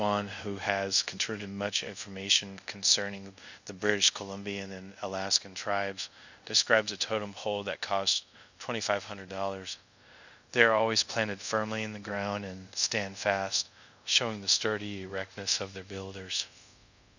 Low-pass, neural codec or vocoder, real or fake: 7.2 kHz; codec, 24 kHz, 0.5 kbps, DualCodec; fake